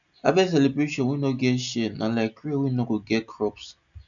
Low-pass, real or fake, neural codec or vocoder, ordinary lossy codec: 7.2 kHz; real; none; none